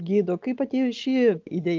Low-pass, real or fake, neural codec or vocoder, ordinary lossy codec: 7.2 kHz; real; none; Opus, 24 kbps